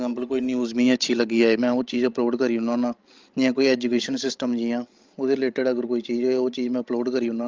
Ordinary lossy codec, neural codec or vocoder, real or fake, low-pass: Opus, 24 kbps; codec, 16 kHz, 16 kbps, FreqCodec, larger model; fake; 7.2 kHz